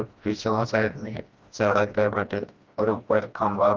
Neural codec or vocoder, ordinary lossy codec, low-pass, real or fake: codec, 16 kHz, 1 kbps, FreqCodec, smaller model; Opus, 24 kbps; 7.2 kHz; fake